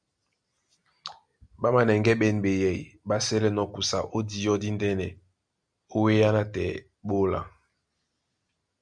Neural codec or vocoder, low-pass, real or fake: none; 9.9 kHz; real